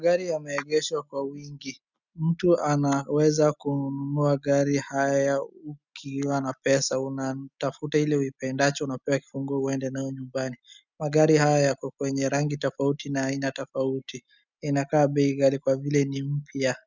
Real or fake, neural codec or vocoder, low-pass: real; none; 7.2 kHz